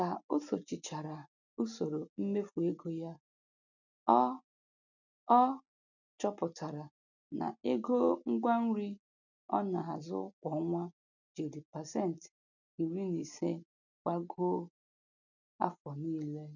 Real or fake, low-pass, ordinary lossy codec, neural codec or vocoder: real; 7.2 kHz; none; none